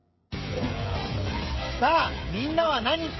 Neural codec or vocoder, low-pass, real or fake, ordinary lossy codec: codec, 16 kHz, 16 kbps, FreqCodec, smaller model; 7.2 kHz; fake; MP3, 24 kbps